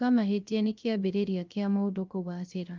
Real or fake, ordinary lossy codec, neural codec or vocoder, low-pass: fake; Opus, 24 kbps; codec, 16 kHz, 0.3 kbps, FocalCodec; 7.2 kHz